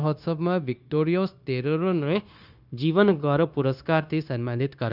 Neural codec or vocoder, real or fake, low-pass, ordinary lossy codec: codec, 16 kHz, 0.9 kbps, LongCat-Audio-Codec; fake; 5.4 kHz; none